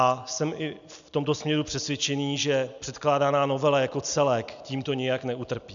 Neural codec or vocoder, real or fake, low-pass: none; real; 7.2 kHz